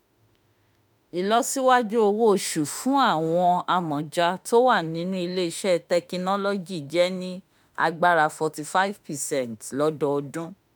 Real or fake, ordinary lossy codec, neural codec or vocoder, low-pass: fake; none; autoencoder, 48 kHz, 32 numbers a frame, DAC-VAE, trained on Japanese speech; none